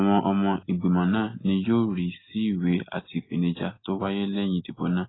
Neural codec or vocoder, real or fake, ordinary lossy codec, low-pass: none; real; AAC, 16 kbps; 7.2 kHz